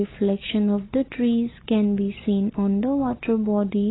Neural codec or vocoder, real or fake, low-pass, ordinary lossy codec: none; real; 7.2 kHz; AAC, 16 kbps